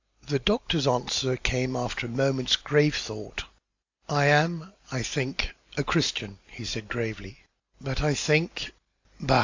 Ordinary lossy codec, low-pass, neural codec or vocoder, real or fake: AAC, 48 kbps; 7.2 kHz; none; real